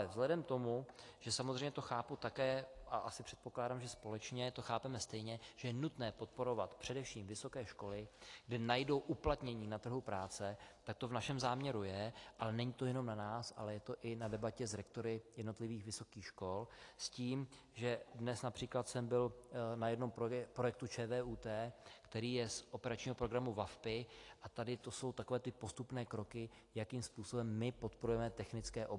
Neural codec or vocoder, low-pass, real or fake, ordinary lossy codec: none; 10.8 kHz; real; AAC, 48 kbps